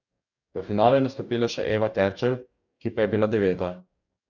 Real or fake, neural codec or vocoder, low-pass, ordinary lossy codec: fake; codec, 44.1 kHz, 2.6 kbps, DAC; 7.2 kHz; none